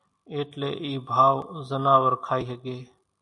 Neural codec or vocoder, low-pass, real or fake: none; 10.8 kHz; real